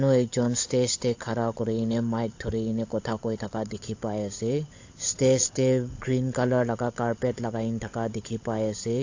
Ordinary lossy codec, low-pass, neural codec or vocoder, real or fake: AAC, 48 kbps; 7.2 kHz; codec, 16 kHz, 16 kbps, FunCodec, trained on LibriTTS, 50 frames a second; fake